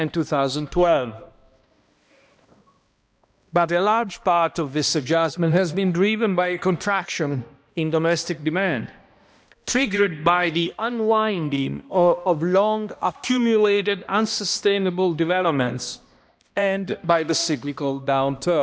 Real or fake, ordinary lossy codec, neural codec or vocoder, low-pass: fake; none; codec, 16 kHz, 1 kbps, X-Codec, HuBERT features, trained on balanced general audio; none